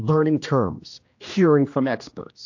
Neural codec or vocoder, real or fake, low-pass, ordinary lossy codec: codec, 16 kHz, 1 kbps, X-Codec, HuBERT features, trained on general audio; fake; 7.2 kHz; AAC, 48 kbps